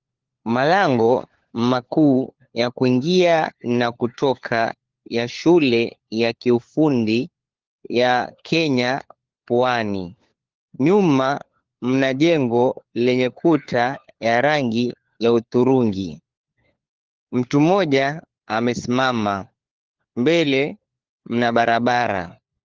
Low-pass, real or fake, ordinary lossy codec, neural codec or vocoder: 7.2 kHz; fake; Opus, 16 kbps; codec, 16 kHz, 4 kbps, FunCodec, trained on LibriTTS, 50 frames a second